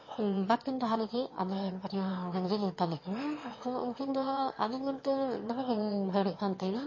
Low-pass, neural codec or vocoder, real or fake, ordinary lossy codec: 7.2 kHz; autoencoder, 22.05 kHz, a latent of 192 numbers a frame, VITS, trained on one speaker; fake; MP3, 32 kbps